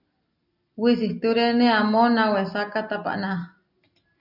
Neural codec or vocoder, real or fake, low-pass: none; real; 5.4 kHz